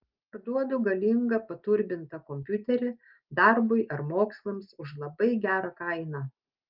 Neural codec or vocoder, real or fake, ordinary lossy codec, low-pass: none; real; Opus, 24 kbps; 5.4 kHz